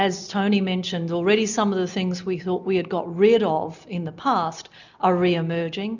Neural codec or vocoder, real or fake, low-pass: none; real; 7.2 kHz